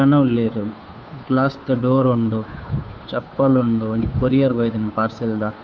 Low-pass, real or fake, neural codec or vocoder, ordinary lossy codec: none; fake; codec, 16 kHz, 2 kbps, FunCodec, trained on Chinese and English, 25 frames a second; none